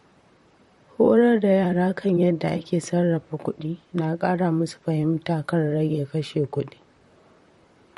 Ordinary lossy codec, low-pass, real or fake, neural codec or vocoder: MP3, 48 kbps; 19.8 kHz; fake; vocoder, 44.1 kHz, 128 mel bands, Pupu-Vocoder